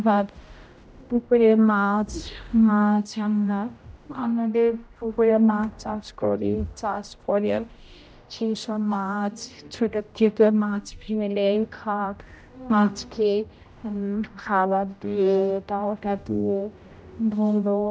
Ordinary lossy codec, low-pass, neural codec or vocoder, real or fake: none; none; codec, 16 kHz, 0.5 kbps, X-Codec, HuBERT features, trained on general audio; fake